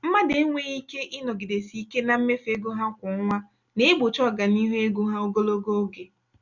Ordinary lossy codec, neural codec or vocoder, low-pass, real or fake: none; none; 7.2 kHz; real